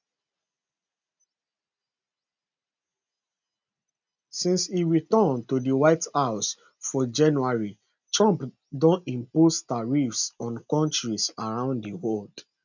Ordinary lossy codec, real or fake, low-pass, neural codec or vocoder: none; real; 7.2 kHz; none